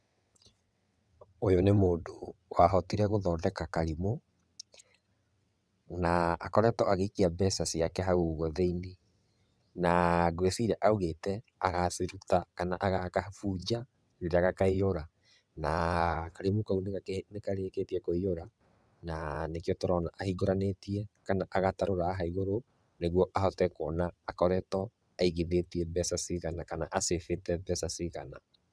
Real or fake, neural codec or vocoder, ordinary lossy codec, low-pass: fake; vocoder, 22.05 kHz, 80 mel bands, WaveNeXt; none; none